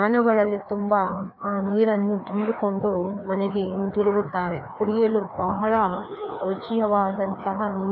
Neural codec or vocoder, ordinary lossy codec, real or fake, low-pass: codec, 16 kHz, 2 kbps, FreqCodec, larger model; AAC, 48 kbps; fake; 5.4 kHz